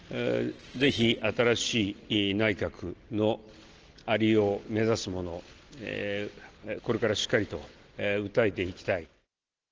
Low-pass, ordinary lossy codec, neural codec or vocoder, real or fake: 7.2 kHz; Opus, 16 kbps; none; real